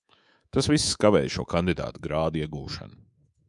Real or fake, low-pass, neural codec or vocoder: fake; 10.8 kHz; codec, 24 kHz, 3.1 kbps, DualCodec